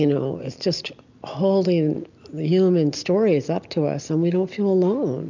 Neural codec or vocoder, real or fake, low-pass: none; real; 7.2 kHz